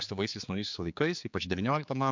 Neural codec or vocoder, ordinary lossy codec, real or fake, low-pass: codec, 16 kHz, 4 kbps, X-Codec, HuBERT features, trained on general audio; MP3, 64 kbps; fake; 7.2 kHz